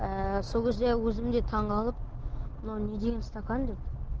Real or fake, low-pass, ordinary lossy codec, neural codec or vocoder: real; 7.2 kHz; Opus, 16 kbps; none